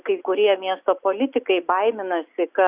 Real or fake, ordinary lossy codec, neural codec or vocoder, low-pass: real; Opus, 64 kbps; none; 3.6 kHz